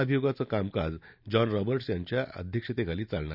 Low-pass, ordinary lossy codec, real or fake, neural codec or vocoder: 5.4 kHz; none; real; none